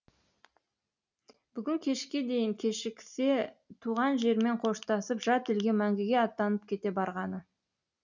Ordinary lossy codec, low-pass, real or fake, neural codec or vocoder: none; 7.2 kHz; real; none